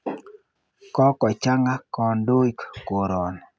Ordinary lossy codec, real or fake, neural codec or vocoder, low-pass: none; real; none; none